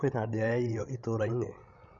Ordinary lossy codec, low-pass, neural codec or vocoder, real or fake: Opus, 64 kbps; 7.2 kHz; codec, 16 kHz, 16 kbps, FreqCodec, larger model; fake